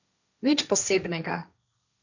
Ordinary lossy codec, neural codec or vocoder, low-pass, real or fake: none; codec, 16 kHz, 1.1 kbps, Voila-Tokenizer; 7.2 kHz; fake